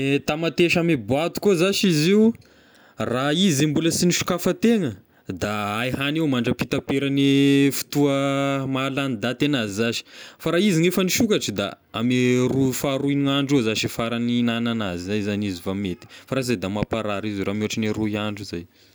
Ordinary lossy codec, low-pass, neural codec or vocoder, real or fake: none; none; none; real